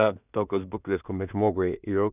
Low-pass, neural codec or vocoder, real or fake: 3.6 kHz; codec, 16 kHz in and 24 kHz out, 0.4 kbps, LongCat-Audio-Codec, two codebook decoder; fake